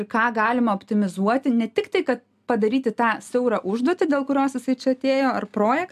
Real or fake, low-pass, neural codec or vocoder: real; 14.4 kHz; none